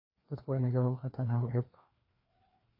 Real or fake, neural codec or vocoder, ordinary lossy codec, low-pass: fake; codec, 16 kHz, 2 kbps, FreqCodec, larger model; AAC, 32 kbps; 5.4 kHz